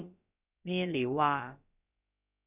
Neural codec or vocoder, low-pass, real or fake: codec, 16 kHz, about 1 kbps, DyCAST, with the encoder's durations; 3.6 kHz; fake